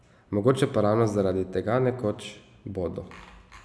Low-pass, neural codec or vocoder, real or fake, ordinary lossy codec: none; none; real; none